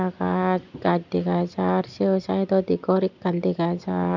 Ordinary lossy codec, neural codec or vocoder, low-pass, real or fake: none; none; 7.2 kHz; real